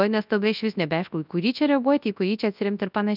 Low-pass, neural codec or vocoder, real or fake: 5.4 kHz; codec, 24 kHz, 0.9 kbps, WavTokenizer, large speech release; fake